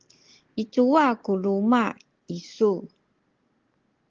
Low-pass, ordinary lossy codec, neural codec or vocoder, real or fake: 7.2 kHz; Opus, 16 kbps; none; real